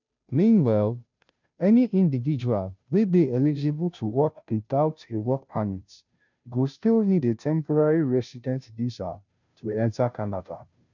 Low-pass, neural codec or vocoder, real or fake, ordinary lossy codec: 7.2 kHz; codec, 16 kHz, 0.5 kbps, FunCodec, trained on Chinese and English, 25 frames a second; fake; none